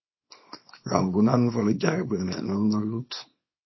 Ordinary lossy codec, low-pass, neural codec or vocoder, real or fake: MP3, 24 kbps; 7.2 kHz; codec, 24 kHz, 0.9 kbps, WavTokenizer, small release; fake